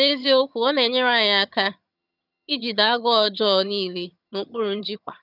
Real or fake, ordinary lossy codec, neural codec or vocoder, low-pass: fake; none; vocoder, 22.05 kHz, 80 mel bands, HiFi-GAN; 5.4 kHz